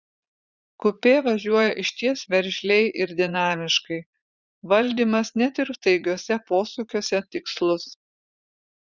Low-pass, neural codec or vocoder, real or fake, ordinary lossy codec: 7.2 kHz; vocoder, 44.1 kHz, 80 mel bands, Vocos; fake; Opus, 64 kbps